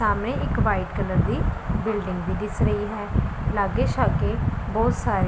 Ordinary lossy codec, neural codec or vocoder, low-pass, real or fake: none; none; none; real